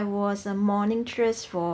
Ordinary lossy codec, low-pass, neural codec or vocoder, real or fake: none; none; none; real